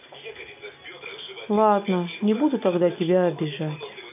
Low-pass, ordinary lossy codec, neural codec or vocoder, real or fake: 3.6 kHz; MP3, 32 kbps; none; real